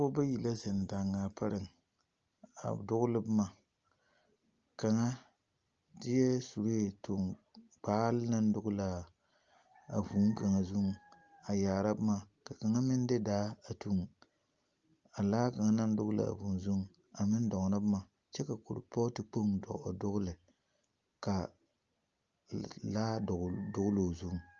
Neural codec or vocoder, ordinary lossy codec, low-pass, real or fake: none; Opus, 24 kbps; 7.2 kHz; real